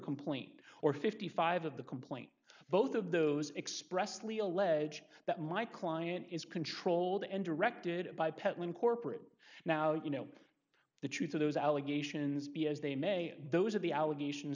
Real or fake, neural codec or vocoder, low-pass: real; none; 7.2 kHz